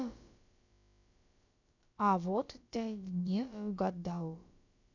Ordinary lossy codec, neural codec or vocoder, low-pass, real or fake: none; codec, 16 kHz, about 1 kbps, DyCAST, with the encoder's durations; 7.2 kHz; fake